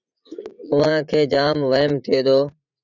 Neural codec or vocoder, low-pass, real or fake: vocoder, 44.1 kHz, 80 mel bands, Vocos; 7.2 kHz; fake